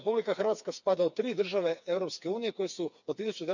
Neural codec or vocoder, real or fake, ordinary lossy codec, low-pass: codec, 16 kHz, 4 kbps, FreqCodec, smaller model; fake; none; 7.2 kHz